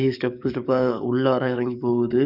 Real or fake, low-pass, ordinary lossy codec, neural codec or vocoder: fake; 5.4 kHz; none; codec, 44.1 kHz, 7.8 kbps, DAC